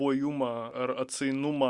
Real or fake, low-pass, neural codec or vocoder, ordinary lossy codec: real; 10.8 kHz; none; Opus, 64 kbps